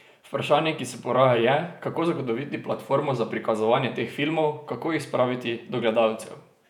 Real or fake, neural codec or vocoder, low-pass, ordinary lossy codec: fake; vocoder, 48 kHz, 128 mel bands, Vocos; 19.8 kHz; none